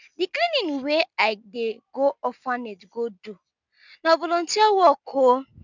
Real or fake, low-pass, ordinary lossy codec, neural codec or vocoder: real; 7.2 kHz; none; none